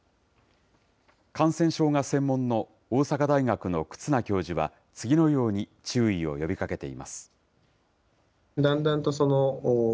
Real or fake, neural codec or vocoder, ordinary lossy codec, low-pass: real; none; none; none